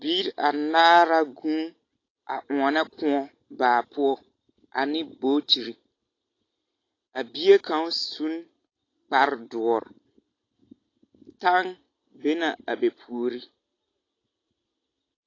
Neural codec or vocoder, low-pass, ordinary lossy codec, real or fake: none; 7.2 kHz; AAC, 32 kbps; real